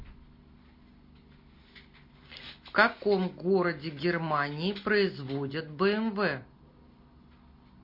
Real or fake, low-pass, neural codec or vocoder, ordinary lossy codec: real; 5.4 kHz; none; MP3, 32 kbps